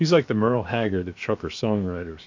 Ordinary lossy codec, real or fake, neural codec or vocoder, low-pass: MP3, 48 kbps; fake; codec, 16 kHz, 0.7 kbps, FocalCodec; 7.2 kHz